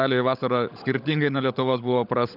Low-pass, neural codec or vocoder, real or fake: 5.4 kHz; codec, 16 kHz, 16 kbps, FunCodec, trained on LibriTTS, 50 frames a second; fake